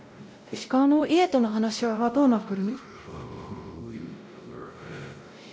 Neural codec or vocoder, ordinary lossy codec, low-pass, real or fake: codec, 16 kHz, 0.5 kbps, X-Codec, WavLM features, trained on Multilingual LibriSpeech; none; none; fake